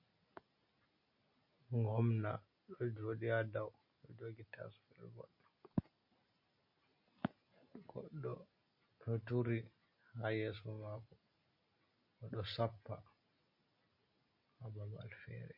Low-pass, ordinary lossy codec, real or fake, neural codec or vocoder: 5.4 kHz; MP3, 32 kbps; real; none